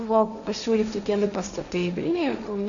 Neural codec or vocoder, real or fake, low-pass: codec, 16 kHz, 1.1 kbps, Voila-Tokenizer; fake; 7.2 kHz